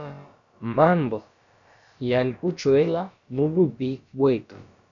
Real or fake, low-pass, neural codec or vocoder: fake; 7.2 kHz; codec, 16 kHz, about 1 kbps, DyCAST, with the encoder's durations